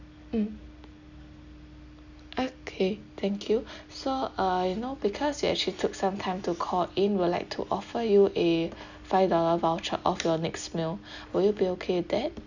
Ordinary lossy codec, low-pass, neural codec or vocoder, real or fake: none; 7.2 kHz; none; real